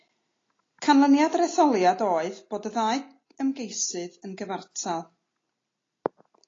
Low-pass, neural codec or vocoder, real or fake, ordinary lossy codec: 7.2 kHz; none; real; AAC, 32 kbps